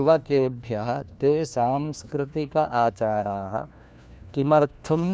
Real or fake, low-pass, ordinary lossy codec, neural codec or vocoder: fake; none; none; codec, 16 kHz, 1 kbps, FunCodec, trained on LibriTTS, 50 frames a second